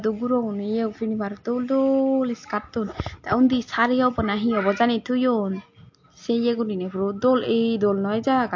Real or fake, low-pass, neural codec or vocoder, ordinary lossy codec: real; 7.2 kHz; none; MP3, 48 kbps